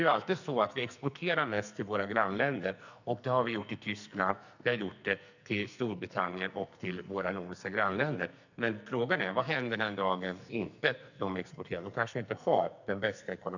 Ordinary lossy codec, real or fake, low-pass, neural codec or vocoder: none; fake; 7.2 kHz; codec, 44.1 kHz, 2.6 kbps, SNAC